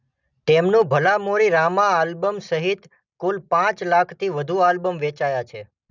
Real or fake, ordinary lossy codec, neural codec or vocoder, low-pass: real; none; none; 7.2 kHz